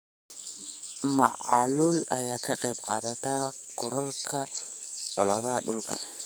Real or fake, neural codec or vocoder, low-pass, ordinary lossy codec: fake; codec, 44.1 kHz, 2.6 kbps, SNAC; none; none